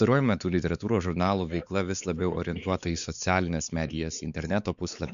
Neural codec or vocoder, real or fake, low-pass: codec, 16 kHz, 4 kbps, X-Codec, WavLM features, trained on Multilingual LibriSpeech; fake; 7.2 kHz